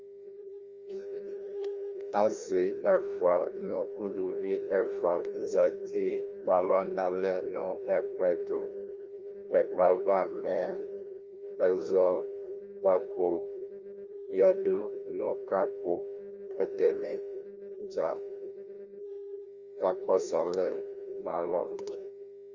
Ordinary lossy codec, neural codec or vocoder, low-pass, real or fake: Opus, 32 kbps; codec, 16 kHz, 1 kbps, FreqCodec, larger model; 7.2 kHz; fake